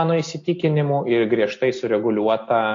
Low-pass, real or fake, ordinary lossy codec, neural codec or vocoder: 7.2 kHz; real; AAC, 48 kbps; none